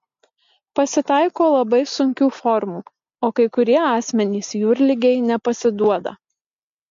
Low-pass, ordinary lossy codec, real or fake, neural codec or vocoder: 7.2 kHz; MP3, 48 kbps; real; none